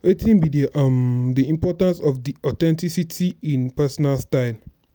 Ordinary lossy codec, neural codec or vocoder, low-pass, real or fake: none; none; none; real